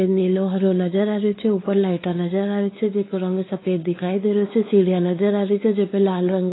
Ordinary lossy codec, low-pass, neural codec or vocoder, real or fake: AAC, 16 kbps; 7.2 kHz; codec, 16 kHz, 4 kbps, X-Codec, WavLM features, trained on Multilingual LibriSpeech; fake